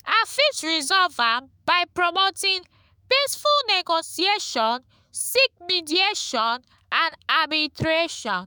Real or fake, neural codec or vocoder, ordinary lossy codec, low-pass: fake; autoencoder, 48 kHz, 128 numbers a frame, DAC-VAE, trained on Japanese speech; none; none